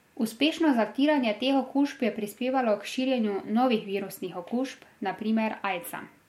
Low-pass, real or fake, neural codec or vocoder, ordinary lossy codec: 19.8 kHz; real; none; MP3, 64 kbps